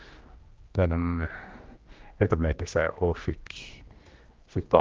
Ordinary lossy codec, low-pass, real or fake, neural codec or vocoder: Opus, 24 kbps; 7.2 kHz; fake; codec, 16 kHz, 1 kbps, X-Codec, HuBERT features, trained on general audio